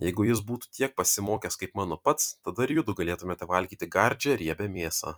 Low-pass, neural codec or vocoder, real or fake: 19.8 kHz; vocoder, 44.1 kHz, 128 mel bands every 256 samples, BigVGAN v2; fake